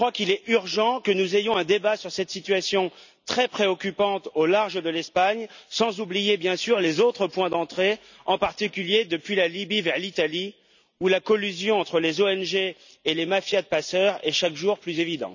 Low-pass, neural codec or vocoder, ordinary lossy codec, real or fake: 7.2 kHz; none; none; real